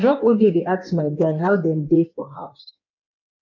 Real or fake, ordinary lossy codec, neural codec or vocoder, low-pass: fake; none; codec, 16 kHz in and 24 kHz out, 1.1 kbps, FireRedTTS-2 codec; 7.2 kHz